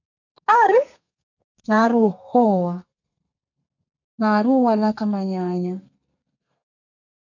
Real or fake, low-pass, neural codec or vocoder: fake; 7.2 kHz; codec, 32 kHz, 1.9 kbps, SNAC